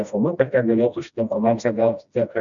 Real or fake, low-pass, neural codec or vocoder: fake; 7.2 kHz; codec, 16 kHz, 1 kbps, FreqCodec, smaller model